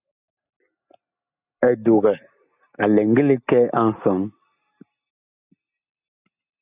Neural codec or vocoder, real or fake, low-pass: none; real; 3.6 kHz